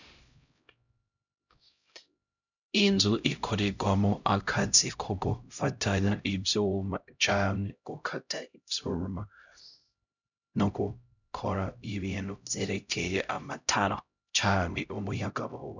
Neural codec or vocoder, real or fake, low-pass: codec, 16 kHz, 0.5 kbps, X-Codec, HuBERT features, trained on LibriSpeech; fake; 7.2 kHz